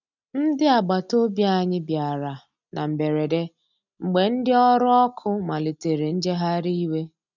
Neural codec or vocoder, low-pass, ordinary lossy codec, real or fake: none; 7.2 kHz; none; real